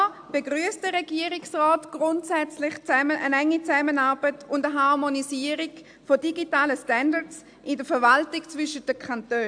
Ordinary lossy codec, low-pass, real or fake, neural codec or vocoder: AAC, 64 kbps; 9.9 kHz; real; none